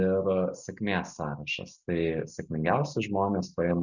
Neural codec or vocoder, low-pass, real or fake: none; 7.2 kHz; real